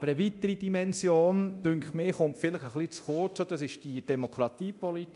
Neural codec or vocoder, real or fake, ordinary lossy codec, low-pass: codec, 24 kHz, 0.9 kbps, DualCodec; fake; AAC, 96 kbps; 10.8 kHz